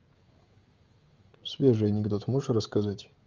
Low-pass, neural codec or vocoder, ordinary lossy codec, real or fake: 7.2 kHz; codec, 16 kHz, 16 kbps, FreqCodec, smaller model; Opus, 32 kbps; fake